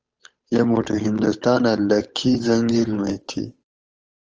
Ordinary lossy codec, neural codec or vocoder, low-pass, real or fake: Opus, 24 kbps; codec, 16 kHz, 8 kbps, FunCodec, trained on Chinese and English, 25 frames a second; 7.2 kHz; fake